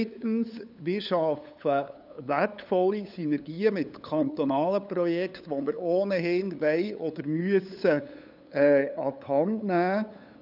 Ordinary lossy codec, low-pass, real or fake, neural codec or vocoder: none; 5.4 kHz; fake; codec, 16 kHz, 8 kbps, FunCodec, trained on LibriTTS, 25 frames a second